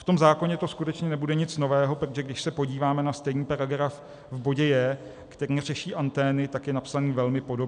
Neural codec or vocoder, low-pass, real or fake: none; 9.9 kHz; real